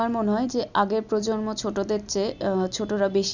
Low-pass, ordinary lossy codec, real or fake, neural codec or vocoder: 7.2 kHz; none; real; none